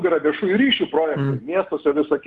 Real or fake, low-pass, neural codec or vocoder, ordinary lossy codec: real; 10.8 kHz; none; Opus, 24 kbps